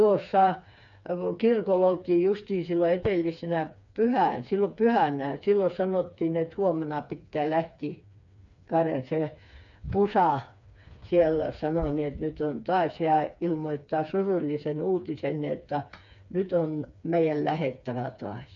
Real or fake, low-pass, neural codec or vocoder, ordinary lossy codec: fake; 7.2 kHz; codec, 16 kHz, 4 kbps, FreqCodec, smaller model; none